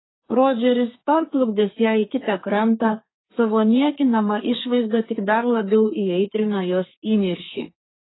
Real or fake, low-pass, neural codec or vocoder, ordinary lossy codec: fake; 7.2 kHz; codec, 32 kHz, 1.9 kbps, SNAC; AAC, 16 kbps